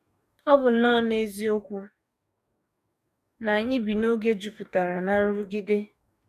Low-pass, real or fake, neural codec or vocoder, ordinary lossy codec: 14.4 kHz; fake; codec, 44.1 kHz, 2.6 kbps, DAC; none